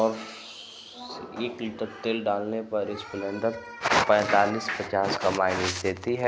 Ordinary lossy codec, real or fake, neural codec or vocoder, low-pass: none; real; none; none